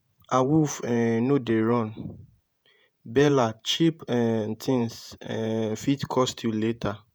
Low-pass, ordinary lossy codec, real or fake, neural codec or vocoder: none; none; fake; vocoder, 48 kHz, 128 mel bands, Vocos